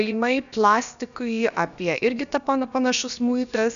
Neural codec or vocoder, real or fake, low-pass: codec, 16 kHz, about 1 kbps, DyCAST, with the encoder's durations; fake; 7.2 kHz